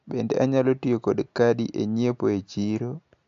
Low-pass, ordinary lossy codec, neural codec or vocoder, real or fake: 7.2 kHz; AAC, 64 kbps; none; real